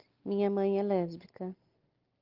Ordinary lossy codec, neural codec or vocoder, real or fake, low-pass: Opus, 32 kbps; none; real; 5.4 kHz